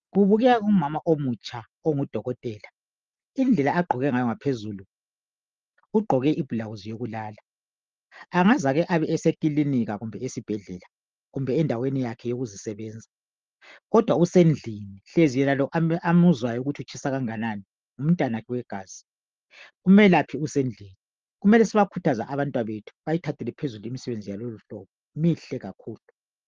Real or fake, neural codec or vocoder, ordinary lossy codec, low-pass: real; none; Opus, 32 kbps; 7.2 kHz